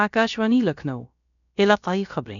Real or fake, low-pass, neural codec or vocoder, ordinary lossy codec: fake; 7.2 kHz; codec, 16 kHz, about 1 kbps, DyCAST, with the encoder's durations; none